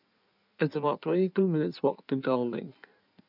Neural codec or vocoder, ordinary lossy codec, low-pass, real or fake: codec, 16 kHz in and 24 kHz out, 1.1 kbps, FireRedTTS-2 codec; none; 5.4 kHz; fake